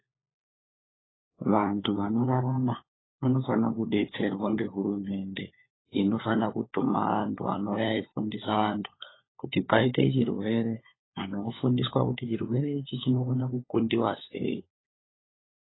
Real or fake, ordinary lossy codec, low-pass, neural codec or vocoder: fake; AAC, 16 kbps; 7.2 kHz; codec, 16 kHz, 4 kbps, FunCodec, trained on LibriTTS, 50 frames a second